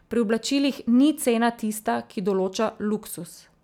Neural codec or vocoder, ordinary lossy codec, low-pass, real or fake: none; none; 19.8 kHz; real